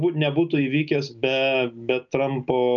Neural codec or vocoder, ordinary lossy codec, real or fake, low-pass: none; MP3, 96 kbps; real; 7.2 kHz